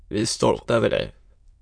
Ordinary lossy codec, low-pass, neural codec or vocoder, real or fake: MP3, 64 kbps; 9.9 kHz; autoencoder, 22.05 kHz, a latent of 192 numbers a frame, VITS, trained on many speakers; fake